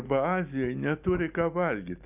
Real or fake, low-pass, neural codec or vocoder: real; 3.6 kHz; none